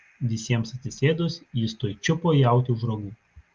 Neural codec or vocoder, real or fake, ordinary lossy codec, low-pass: none; real; Opus, 32 kbps; 7.2 kHz